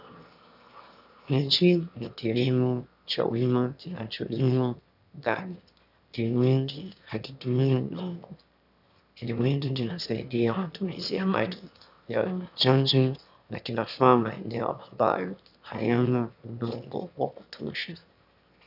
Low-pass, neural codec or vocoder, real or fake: 5.4 kHz; autoencoder, 22.05 kHz, a latent of 192 numbers a frame, VITS, trained on one speaker; fake